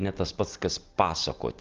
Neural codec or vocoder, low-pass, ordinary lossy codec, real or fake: none; 7.2 kHz; Opus, 32 kbps; real